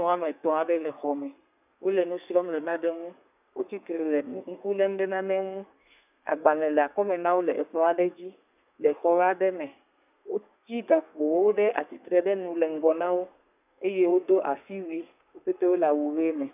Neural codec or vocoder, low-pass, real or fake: codec, 32 kHz, 1.9 kbps, SNAC; 3.6 kHz; fake